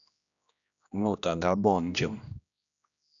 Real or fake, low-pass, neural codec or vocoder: fake; 7.2 kHz; codec, 16 kHz, 1 kbps, X-Codec, HuBERT features, trained on general audio